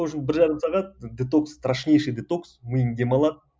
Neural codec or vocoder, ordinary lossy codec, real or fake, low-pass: none; none; real; none